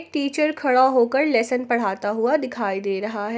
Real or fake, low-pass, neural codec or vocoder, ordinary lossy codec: real; none; none; none